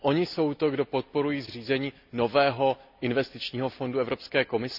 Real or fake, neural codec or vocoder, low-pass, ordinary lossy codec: real; none; 5.4 kHz; none